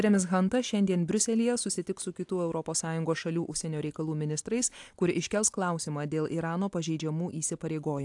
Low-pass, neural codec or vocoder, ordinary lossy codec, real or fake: 10.8 kHz; none; AAC, 64 kbps; real